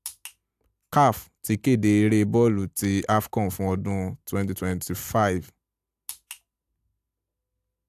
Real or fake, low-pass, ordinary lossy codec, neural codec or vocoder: real; 14.4 kHz; AAC, 96 kbps; none